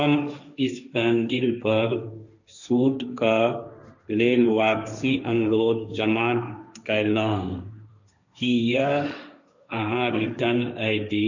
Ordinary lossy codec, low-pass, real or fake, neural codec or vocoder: none; 7.2 kHz; fake; codec, 16 kHz, 1.1 kbps, Voila-Tokenizer